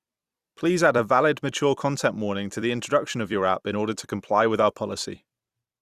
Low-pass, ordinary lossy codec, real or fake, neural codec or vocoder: 14.4 kHz; Opus, 64 kbps; fake; vocoder, 44.1 kHz, 128 mel bands every 256 samples, BigVGAN v2